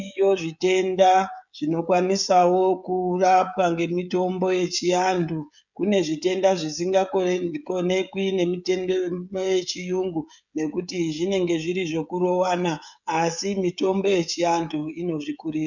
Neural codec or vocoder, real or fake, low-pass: codec, 16 kHz, 16 kbps, FreqCodec, smaller model; fake; 7.2 kHz